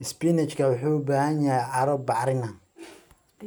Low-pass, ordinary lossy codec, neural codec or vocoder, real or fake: none; none; none; real